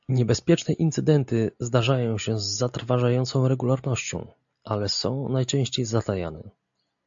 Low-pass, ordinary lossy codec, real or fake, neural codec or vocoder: 7.2 kHz; AAC, 64 kbps; real; none